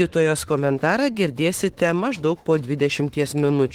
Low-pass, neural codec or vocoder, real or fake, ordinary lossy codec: 19.8 kHz; autoencoder, 48 kHz, 32 numbers a frame, DAC-VAE, trained on Japanese speech; fake; Opus, 16 kbps